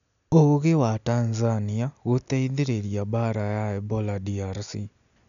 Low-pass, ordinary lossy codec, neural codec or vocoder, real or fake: 7.2 kHz; none; none; real